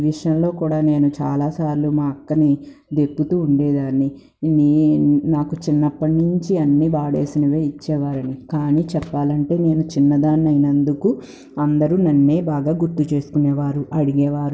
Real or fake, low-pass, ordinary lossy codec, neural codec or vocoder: real; none; none; none